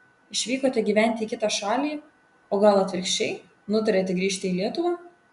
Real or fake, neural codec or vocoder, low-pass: real; none; 10.8 kHz